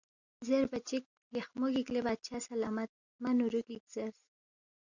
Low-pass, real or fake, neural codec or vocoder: 7.2 kHz; real; none